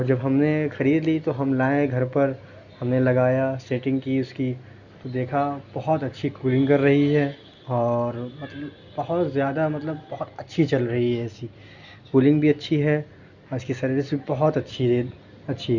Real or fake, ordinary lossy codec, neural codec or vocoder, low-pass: real; Opus, 64 kbps; none; 7.2 kHz